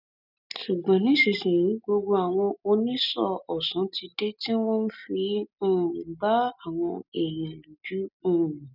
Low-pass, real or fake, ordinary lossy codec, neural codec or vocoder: 5.4 kHz; real; none; none